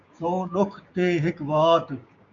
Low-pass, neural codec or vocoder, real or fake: 7.2 kHz; codec, 16 kHz, 6 kbps, DAC; fake